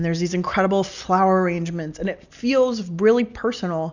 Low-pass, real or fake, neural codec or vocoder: 7.2 kHz; real; none